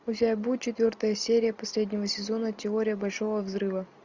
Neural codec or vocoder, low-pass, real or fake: none; 7.2 kHz; real